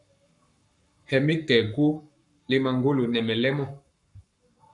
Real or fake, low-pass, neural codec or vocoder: fake; 10.8 kHz; codec, 44.1 kHz, 7.8 kbps, Pupu-Codec